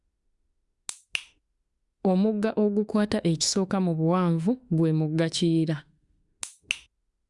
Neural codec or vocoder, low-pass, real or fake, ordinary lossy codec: autoencoder, 48 kHz, 32 numbers a frame, DAC-VAE, trained on Japanese speech; 10.8 kHz; fake; Opus, 64 kbps